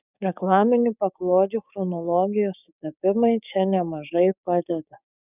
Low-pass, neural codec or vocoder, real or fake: 3.6 kHz; codec, 16 kHz, 6 kbps, DAC; fake